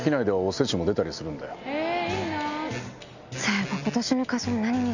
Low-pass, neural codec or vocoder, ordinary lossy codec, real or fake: 7.2 kHz; none; none; real